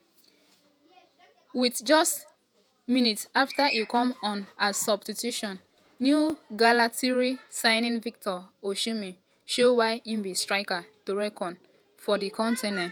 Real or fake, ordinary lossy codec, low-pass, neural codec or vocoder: fake; none; none; vocoder, 48 kHz, 128 mel bands, Vocos